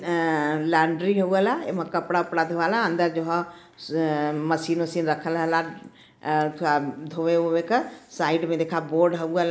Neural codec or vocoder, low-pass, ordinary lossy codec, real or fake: none; none; none; real